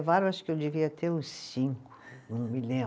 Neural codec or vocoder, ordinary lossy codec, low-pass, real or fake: none; none; none; real